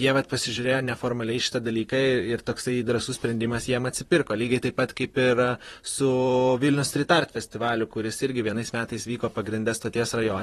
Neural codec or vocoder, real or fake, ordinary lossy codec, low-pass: vocoder, 44.1 kHz, 128 mel bands, Pupu-Vocoder; fake; AAC, 32 kbps; 19.8 kHz